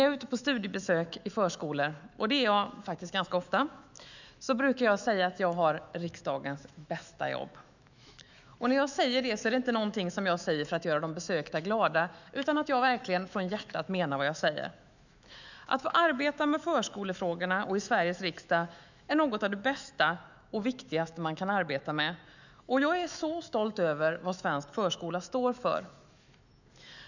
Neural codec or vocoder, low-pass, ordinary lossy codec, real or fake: autoencoder, 48 kHz, 128 numbers a frame, DAC-VAE, trained on Japanese speech; 7.2 kHz; none; fake